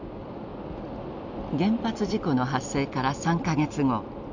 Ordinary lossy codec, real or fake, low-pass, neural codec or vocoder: none; real; 7.2 kHz; none